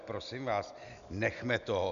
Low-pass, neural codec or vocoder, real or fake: 7.2 kHz; none; real